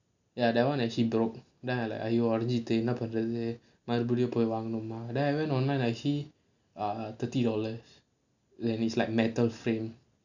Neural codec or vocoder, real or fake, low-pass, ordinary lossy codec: none; real; 7.2 kHz; none